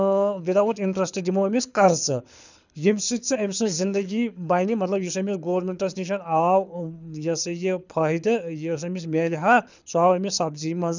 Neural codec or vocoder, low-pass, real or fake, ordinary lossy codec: codec, 24 kHz, 6 kbps, HILCodec; 7.2 kHz; fake; none